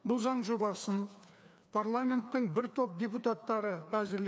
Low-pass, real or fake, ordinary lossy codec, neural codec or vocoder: none; fake; none; codec, 16 kHz, 2 kbps, FreqCodec, larger model